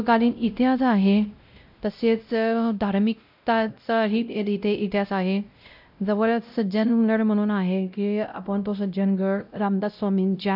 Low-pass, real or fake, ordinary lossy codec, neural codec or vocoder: 5.4 kHz; fake; none; codec, 16 kHz, 0.5 kbps, X-Codec, WavLM features, trained on Multilingual LibriSpeech